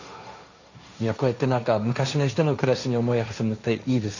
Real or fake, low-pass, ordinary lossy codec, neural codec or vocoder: fake; 7.2 kHz; none; codec, 16 kHz, 1.1 kbps, Voila-Tokenizer